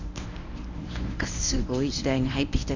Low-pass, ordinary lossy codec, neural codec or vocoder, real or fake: 7.2 kHz; none; codec, 24 kHz, 0.9 kbps, WavTokenizer, medium speech release version 1; fake